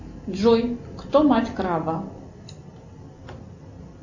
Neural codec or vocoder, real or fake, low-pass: none; real; 7.2 kHz